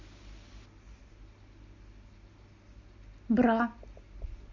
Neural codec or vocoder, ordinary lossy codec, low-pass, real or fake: none; MP3, 48 kbps; 7.2 kHz; real